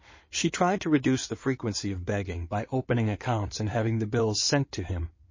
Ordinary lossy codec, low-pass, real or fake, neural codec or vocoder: MP3, 32 kbps; 7.2 kHz; fake; codec, 16 kHz in and 24 kHz out, 2.2 kbps, FireRedTTS-2 codec